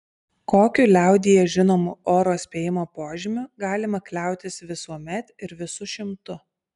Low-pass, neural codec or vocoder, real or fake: 10.8 kHz; none; real